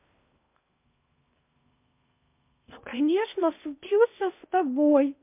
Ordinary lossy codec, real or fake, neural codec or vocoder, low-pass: MP3, 32 kbps; fake; codec, 16 kHz in and 24 kHz out, 0.6 kbps, FocalCodec, streaming, 2048 codes; 3.6 kHz